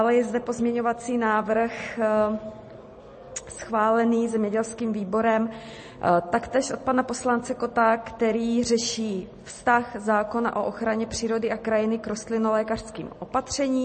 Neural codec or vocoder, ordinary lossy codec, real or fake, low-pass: none; MP3, 32 kbps; real; 10.8 kHz